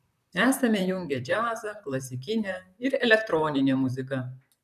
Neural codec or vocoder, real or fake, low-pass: vocoder, 44.1 kHz, 128 mel bands, Pupu-Vocoder; fake; 14.4 kHz